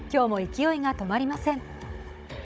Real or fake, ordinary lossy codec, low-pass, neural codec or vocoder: fake; none; none; codec, 16 kHz, 16 kbps, FunCodec, trained on Chinese and English, 50 frames a second